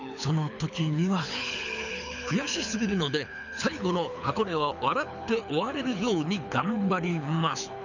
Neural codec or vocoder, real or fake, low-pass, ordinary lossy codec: codec, 24 kHz, 6 kbps, HILCodec; fake; 7.2 kHz; none